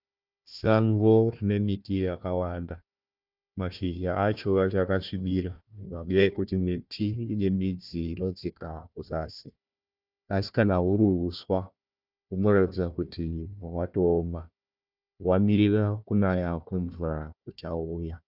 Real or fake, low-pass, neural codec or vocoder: fake; 5.4 kHz; codec, 16 kHz, 1 kbps, FunCodec, trained on Chinese and English, 50 frames a second